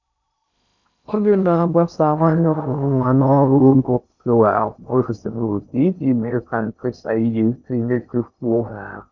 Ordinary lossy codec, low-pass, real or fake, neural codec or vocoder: none; 7.2 kHz; fake; codec, 16 kHz in and 24 kHz out, 0.6 kbps, FocalCodec, streaming, 2048 codes